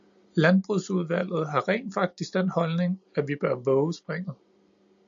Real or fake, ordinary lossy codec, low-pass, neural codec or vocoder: real; MP3, 64 kbps; 7.2 kHz; none